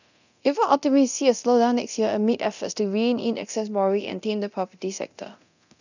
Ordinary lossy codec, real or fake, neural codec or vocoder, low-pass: none; fake; codec, 24 kHz, 0.9 kbps, DualCodec; 7.2 kHz